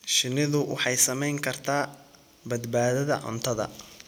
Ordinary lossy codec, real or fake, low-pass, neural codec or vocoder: none; real; none; none